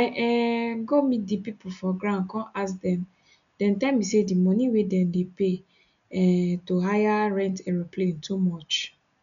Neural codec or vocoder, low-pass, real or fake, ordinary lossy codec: none; 7.2 kHz; real; none